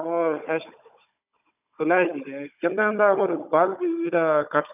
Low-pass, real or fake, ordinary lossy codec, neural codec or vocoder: 3.6 kHz; fake; none; codec, 16 kHz, 16 kbps, FunCodec, trained on Chinese and English, 50 frames a second